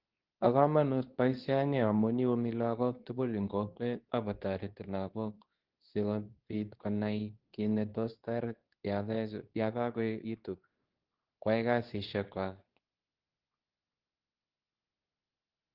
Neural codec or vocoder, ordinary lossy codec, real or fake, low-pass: codec, 24 kHz, 0.9 kbps, WavTokenizer, medium speech release version 2; Opus, 16 kbps; fake; 5.4 kHz